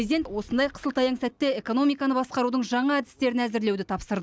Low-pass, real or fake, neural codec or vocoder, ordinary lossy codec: none; real; none; none